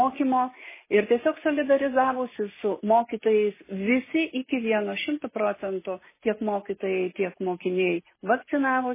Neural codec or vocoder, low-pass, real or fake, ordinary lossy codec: none; 3.6 kHz; real; MP3, 16 kbps